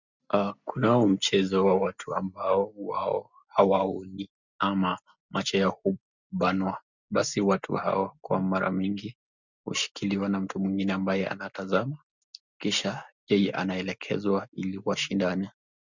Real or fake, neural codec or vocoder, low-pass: real; none; 7.2 kHz